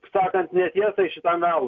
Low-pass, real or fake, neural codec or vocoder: 7.2 kHz; real; none